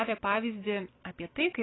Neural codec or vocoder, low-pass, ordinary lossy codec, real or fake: none; 7.2 kHz; AAC, 16 kbps; real